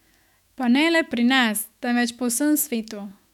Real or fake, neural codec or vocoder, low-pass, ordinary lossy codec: fake; autoencoder, 48 kHz, 128 numbers a frame, DAC-VAE, trained on Japanese speech; 19.8 kHz; none